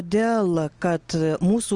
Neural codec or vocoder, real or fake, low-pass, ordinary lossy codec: none; real; 10.8 kHz; Opus, 24 kbps